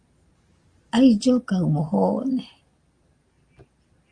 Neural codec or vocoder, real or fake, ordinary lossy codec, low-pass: none; real; Opus, 32 kbps; 9.9 kHz